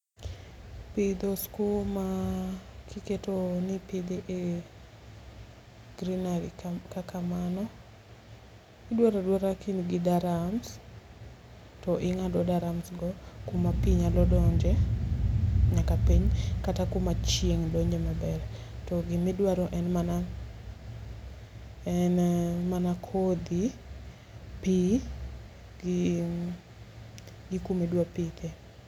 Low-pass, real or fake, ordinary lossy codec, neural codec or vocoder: 19.8 kHz; real; none; none